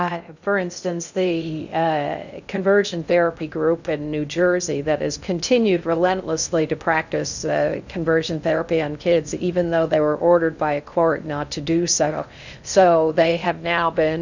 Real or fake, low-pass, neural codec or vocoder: fake; 7.2 kHz; codec, 16 kHz in and 24 kHz out, 0.6 kbps, FocalCodec, streaming, 2048 codes